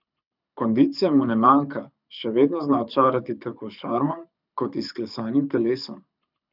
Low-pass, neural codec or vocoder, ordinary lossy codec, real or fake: 5.4 kHz; codec, 24 kHz, 6 kbps, HILCodec; none; fake